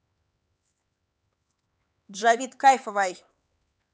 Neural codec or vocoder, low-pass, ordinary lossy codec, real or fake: codec, 16 kHz, 4 kbps, X-Codec, HuBERT features, trained on LibriSpeech; none; none; fake